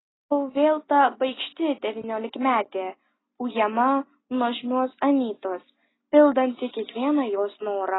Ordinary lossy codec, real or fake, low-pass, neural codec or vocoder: AAC, 16 kbps; real; 7.2 kHz; none